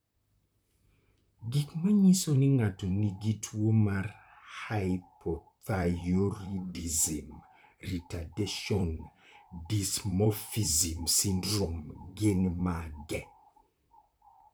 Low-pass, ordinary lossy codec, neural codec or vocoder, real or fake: none; none; vocoder, 44.1 kHz, 128 mel bands, Pupu-Vocoder; fake